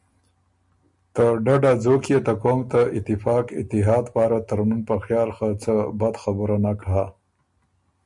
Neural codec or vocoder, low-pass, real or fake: none; 10.8 kHz; real